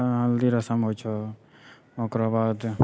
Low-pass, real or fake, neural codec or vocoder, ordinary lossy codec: none; real; none; none